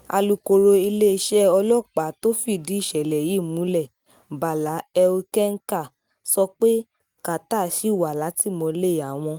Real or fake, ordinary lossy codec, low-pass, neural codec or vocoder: real; Opus, 32 kbps; 19.8 kHz; none